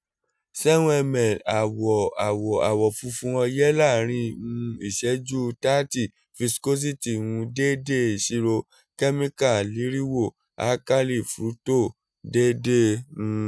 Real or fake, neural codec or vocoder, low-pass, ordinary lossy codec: real; none; none; none